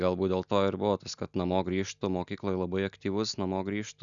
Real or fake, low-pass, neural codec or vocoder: real; 7.2 kHz; none